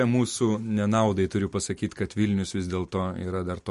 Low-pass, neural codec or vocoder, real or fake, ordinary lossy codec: 14.4 kHz; none; real; MP3, 48 kbps